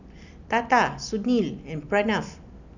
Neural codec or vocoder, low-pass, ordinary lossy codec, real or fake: none; 7.2 kHz; none; real